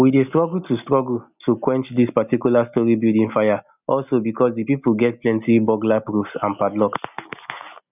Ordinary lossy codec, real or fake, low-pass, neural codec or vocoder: none; real; 3.6 kHz; none